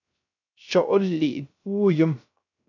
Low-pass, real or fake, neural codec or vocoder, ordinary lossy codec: 7.2 kHz; fake; codec, 16 kHz, 0.3 kbps, FocalCodec; AAC, 48 kbps